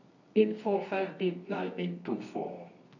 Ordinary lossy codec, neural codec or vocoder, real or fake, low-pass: none; codec, 24 kHz, 0.9 kbps, WavTokenizer, medium music audio release; fake; 7.2 kHz